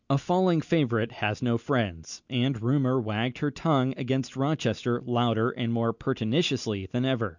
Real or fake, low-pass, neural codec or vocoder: real; 7.2 kHz; none